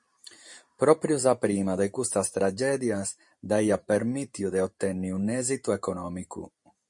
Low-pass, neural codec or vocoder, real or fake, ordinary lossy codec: 10.8 kHz; vocoder, 44.1 kHz, 128 mel bands every 512 samples, BigVGAN v2; fake; MP3, 48 kbps